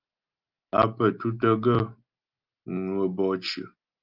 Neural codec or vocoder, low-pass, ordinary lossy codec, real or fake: none; 5.4 kHz; Opus, 32 kbps; real